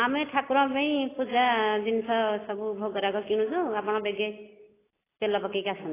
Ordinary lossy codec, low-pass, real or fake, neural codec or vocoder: AAC, 16 kbps; 3.6 kHz; real; none